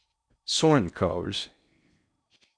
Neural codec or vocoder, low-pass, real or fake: codec, 16 kHz in and 24 kHz out, 0.6 kbps, FocalCodec, streaming, 4096 codes; 9.9 kHz; fake